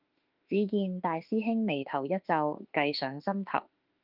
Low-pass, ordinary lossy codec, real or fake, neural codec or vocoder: 5.4 kHz; Opus, 24 kbps; fake; autoencoder, 48 kHz, 32 numbers a frame, DAC-VAE, trained on Japanese speech